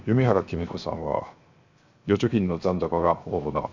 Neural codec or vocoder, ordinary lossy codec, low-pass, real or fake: codec, 16 kHz, 0.7 kbps, FocalCodec; Opus, 64 kbps; 7.2 kHz; fake